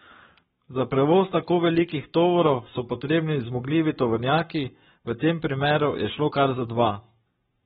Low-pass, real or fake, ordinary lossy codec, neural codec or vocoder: 19.8 kHz; fake; AAC, 16 kbps; codec, 44.1 kHz, 7.8 kbps, Pupu-Codec